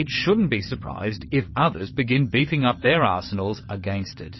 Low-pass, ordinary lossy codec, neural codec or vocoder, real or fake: 7.2 kHz; MP3, 24 kbps; vocoder, 22.05 kHz, 80 mel bands, WaveNeXt; fake